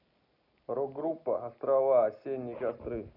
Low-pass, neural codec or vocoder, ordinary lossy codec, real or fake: 5.4 kHz; none; none; real